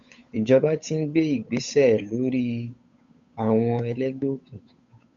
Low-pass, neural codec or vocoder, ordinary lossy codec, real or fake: 7.2 kHz; codec, 16 kHz, 8 kbps, FunCodec, trained on Chinese and English, 25 frames a second; MP3, 64 kbps; fake